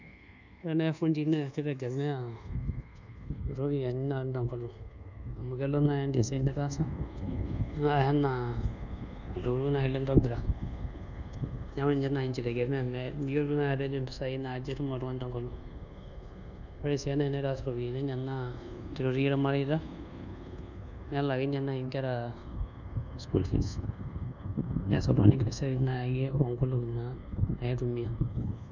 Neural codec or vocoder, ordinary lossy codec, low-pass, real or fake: codec, 24 kHz, 1.2 kbps, DualCodec; none; 7.2 kHz; fake